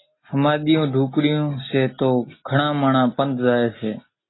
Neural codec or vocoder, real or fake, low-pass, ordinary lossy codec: none; real; 7.2 kHz; AAC, 16 kbps